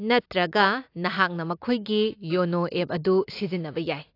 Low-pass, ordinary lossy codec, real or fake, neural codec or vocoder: 5.4 kHz; AAC, 32 kbps; real; none